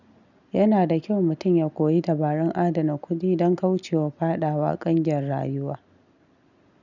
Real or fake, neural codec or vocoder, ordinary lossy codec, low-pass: real; none; none; 7.2 kHz